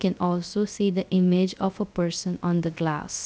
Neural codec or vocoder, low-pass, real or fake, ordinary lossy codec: codec, 16 kHz, 0.3 kbps, FocalCodec; none; fake; none